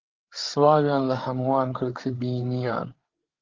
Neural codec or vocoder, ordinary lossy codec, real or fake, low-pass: codec, 16 kHz, 8 kbps, FreqCodec, larger model; Opus, 16 kbps; fake; 7.2 kHz